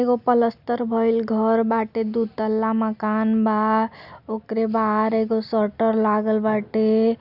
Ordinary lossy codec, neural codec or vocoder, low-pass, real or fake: none; none; 5.4 kHz; real